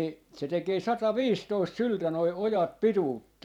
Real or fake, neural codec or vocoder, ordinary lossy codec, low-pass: fake; vocoder, 44.1 kHz, 128 mel bands every 512 samples, BigVGAN v2; none; 19.8 kHz